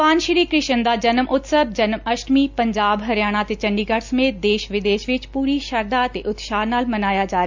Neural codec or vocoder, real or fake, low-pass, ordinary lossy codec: none; real; 7.2 kHz; MP3, 64 kbps